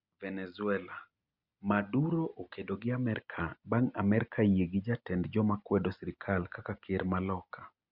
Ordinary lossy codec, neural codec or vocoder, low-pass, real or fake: Opus, 64 kbps; none; 5.4 kHz; real